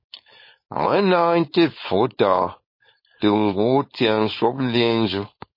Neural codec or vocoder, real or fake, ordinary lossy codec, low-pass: codec, 16 kHz, 4.8 kbps, FACodec; fake; MP3, 24 kbps; 5.4 kHz